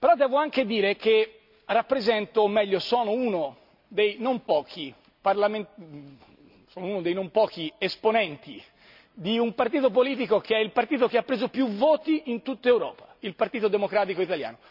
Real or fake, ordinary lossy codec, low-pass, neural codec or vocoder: real; none; 5.4 kHz; none